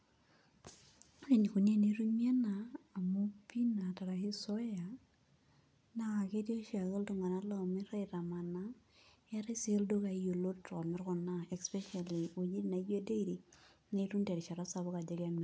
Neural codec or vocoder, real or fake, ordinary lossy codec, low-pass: none; real; none; none